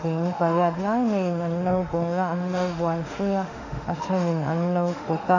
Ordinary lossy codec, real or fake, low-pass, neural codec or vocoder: none; fake; 7.2 kHz; autoencoder, 48 kHz, 32 numbers a frame, DAC-VAE, trained on Japanese speech